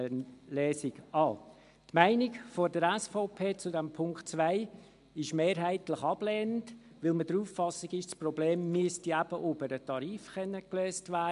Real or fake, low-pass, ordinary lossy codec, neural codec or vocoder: real; 10.8 kHz; MP3, 64 kbps; none